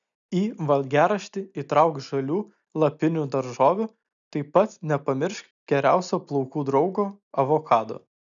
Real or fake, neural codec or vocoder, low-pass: real; none; 7.2 kHz